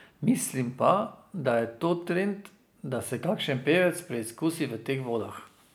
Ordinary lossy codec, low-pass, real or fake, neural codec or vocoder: none; none; real; none